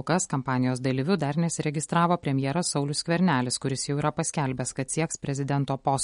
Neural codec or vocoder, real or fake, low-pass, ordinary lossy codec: none; real; 10.8 kHz; MP3, 48 kbps